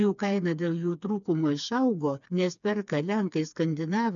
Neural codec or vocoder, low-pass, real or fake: codec, 16 kHz, 4 kbps, FreqCodec, smaller model; 7.2 kHz; fake